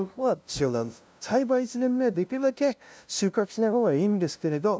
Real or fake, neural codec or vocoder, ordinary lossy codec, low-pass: fake; codec, 16 kHz, 0.5 kbps, FunCodec, trained on LibriTTS, 25 frames a second; none; none